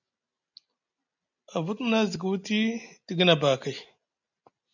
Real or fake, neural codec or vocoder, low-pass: real; none; 7.2 kHz